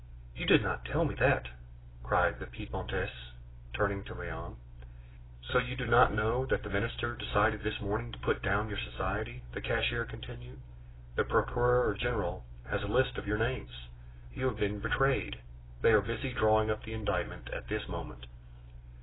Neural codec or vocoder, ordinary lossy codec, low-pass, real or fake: none; AAC, 16 kbps; 7.2 kHz; real